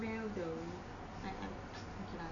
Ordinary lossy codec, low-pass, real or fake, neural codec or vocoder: none; 7.2 kHz; real; none